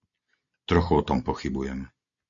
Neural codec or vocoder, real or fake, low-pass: none; real; 7.2 kHz